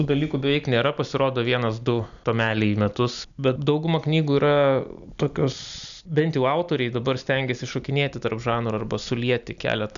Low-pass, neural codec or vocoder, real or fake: 7.2 kHz; none; real